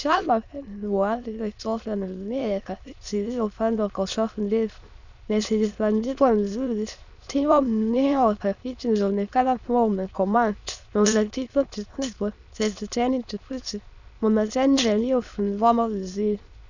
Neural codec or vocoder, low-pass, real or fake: autoencoder, 22.05 kHz, a latent of 192 numbers a frame, VITS, trained on many speakers; 7.2 kHz; fake